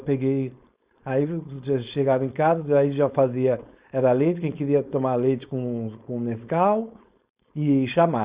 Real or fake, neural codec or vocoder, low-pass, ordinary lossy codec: fake; codec, 16 kHz, 4.8 kbps, FACodec; 3.6 kHz; Opus, 64 kbps